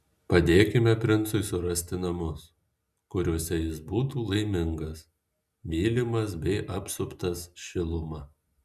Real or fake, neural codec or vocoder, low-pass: real; none; 14.4 kHz